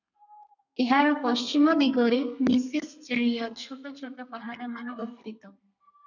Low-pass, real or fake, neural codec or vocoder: 7.2 kHz; fake; codec, 32 kHz, 1.9 kbps, SNAC